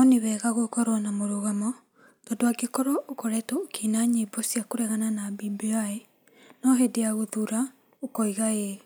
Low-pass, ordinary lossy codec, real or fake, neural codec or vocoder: none; none; real; none